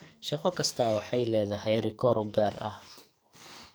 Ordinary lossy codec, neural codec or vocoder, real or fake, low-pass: none; codec, 44.1 kHz, 2.6 kbps, SNAC; fake; none